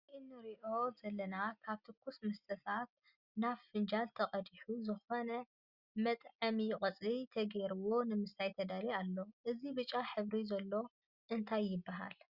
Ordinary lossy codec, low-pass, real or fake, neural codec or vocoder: Opus, 64 kbps; 5.4 kHz; real; none